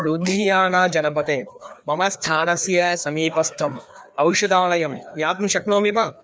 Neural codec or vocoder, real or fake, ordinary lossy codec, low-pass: codec, 16 kHz, 2 kbps, FreqCodec, larger model; fake; none; none